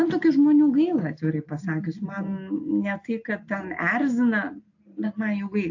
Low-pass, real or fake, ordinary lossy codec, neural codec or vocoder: 7.2 kHz; real; AAC, 48 kbps; none